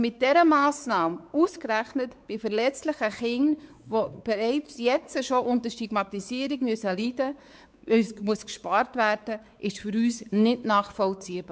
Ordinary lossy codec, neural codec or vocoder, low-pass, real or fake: none; codec, 16 kHz, 4 kbps, X-Codec, WavLM features, trained on Multilingual LibriSpeech; none; fake